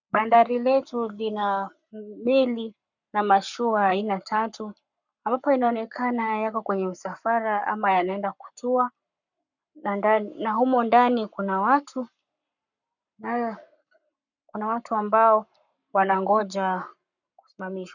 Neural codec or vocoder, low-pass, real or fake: codec, 44.1 kHz, 7.8 kbps, Pupu-Codec; 7.2 kHz; fake